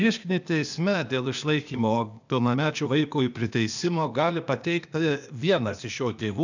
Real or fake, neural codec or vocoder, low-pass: fake; codec, 16 kHz, 0.8 kbps, ZipCodec; 7.2 kHz